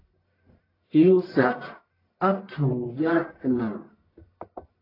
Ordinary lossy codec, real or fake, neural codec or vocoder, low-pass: AAC, 24 kbps; fake; codec, 44.1 kHz, 1.7 kbps, Pupu-Codec; 5.4 kHz